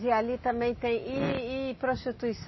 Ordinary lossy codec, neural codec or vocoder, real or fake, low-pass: MP3, 24 kbps; none; real; 7.2 kHz